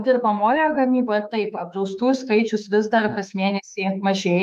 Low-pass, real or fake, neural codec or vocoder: 14.4 kHz; fake; autoencoder, 48 kHz, 32 numbers a frame, DAC-VAE, trained on Japanese speech